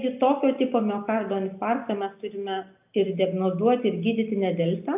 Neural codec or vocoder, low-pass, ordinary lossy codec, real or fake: none; 3.6 kHz; AAC, 32 kbps; real